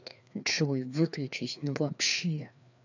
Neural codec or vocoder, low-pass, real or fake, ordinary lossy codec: codec, 16 kHz, 2 kbps, FreqCodec, larger model; 7.2 kHz; fake; none